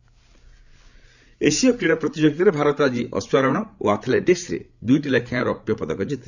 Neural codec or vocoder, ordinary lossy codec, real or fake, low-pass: codec, 16 kHz, 8 kbps, FreqCodec, larger model; none; fake; 7.2 kHz